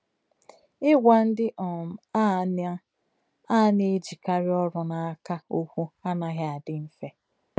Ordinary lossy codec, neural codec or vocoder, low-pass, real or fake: none; none; none; real